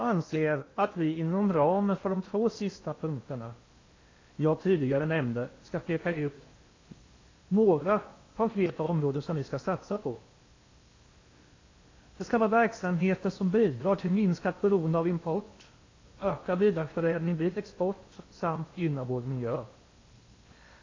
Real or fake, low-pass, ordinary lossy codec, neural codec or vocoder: fake; 7.2 kHz; AAC, 32 kbps; codec, 16 kHz in and 24 kHz out, 0.8 kbps, FocalCodec, streaming, 65536 codes